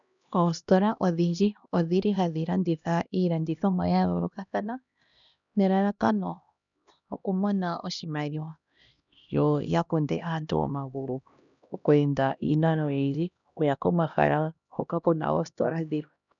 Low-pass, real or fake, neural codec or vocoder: 7.2 kHz; fake; codec, 16 kHz, 1 kbps, X-Codec, HuBERT features, trained on LibriSpeech